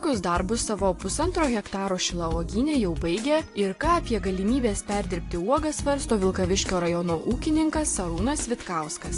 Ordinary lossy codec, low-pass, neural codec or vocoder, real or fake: AAC, 48 kbps; 10.8 kHz; vocoder, 24 kHz, 100 mel bands, Vocos; fake